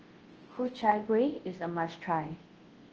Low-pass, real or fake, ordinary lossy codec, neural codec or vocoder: 7.2 kHz; fake; Opus, 16 kbps; codec, 24 kHz, 0.9 kbps, WavTokenizer, large speech release